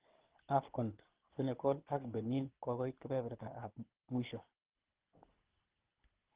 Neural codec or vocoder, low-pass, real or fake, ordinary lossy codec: codec, 24 kHz, 6 kbps, HILCodec; 3.6 kHz; fake; Opus, 16 kbps